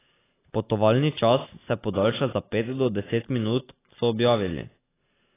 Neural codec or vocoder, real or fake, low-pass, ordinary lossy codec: none; real; 3.6 kHz; AAC, 16 kbps